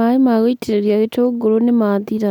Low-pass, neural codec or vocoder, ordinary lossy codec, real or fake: 19.8 kHz; none; none; real